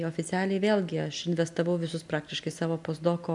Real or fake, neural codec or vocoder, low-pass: real; none; 10.8 kHz